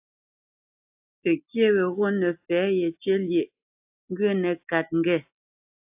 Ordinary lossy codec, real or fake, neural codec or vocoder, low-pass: AAC, 32 kbps; real; none; 3.6 kHz